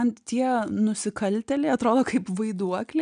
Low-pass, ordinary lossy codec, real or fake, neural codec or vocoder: 9.9 kHz; MP3, 96 kbps; real; none